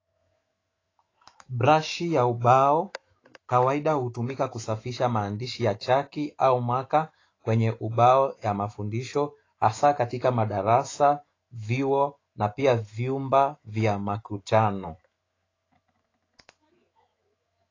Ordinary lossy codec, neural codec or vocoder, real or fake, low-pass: AAC, 32 kbps; autoencoder, 48 kHz, 128 numbers a frame, DAC-VAE, trained on Japanese speech; fake; 7.2 kHz